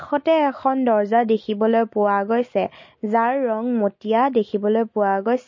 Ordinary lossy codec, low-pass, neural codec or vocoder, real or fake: MP3, 32 kbps; 7.2 kHz; none; real